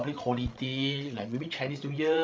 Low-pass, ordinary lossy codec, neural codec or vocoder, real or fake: none; none; codec, 16 kHz, 16 kbps, FreqCodec, larger model; fake